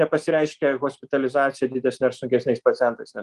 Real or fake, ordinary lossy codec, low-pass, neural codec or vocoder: real; Opus, 24 kbps; 10.8 kHz; none